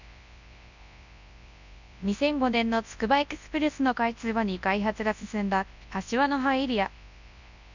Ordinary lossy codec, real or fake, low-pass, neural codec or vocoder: none; fake; 7.2 kHz; codec, 24 kHz, 0.9 kbps, WavTokenizer, large speech release